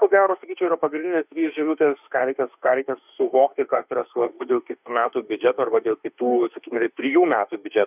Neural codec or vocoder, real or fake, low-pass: autoencoder, 48 kHz, 32 numbers a frame, DAC-VAE, trained on Japanese speech; fake; 3.6 kHz